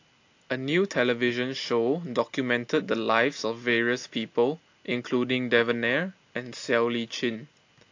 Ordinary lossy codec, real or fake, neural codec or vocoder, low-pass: AAC, 48 kbps; real; none; 7.2 kHz